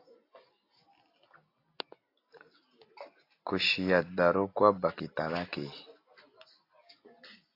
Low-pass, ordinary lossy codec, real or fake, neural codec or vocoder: 5.4 kHz; AAC, 32 kbps; real; none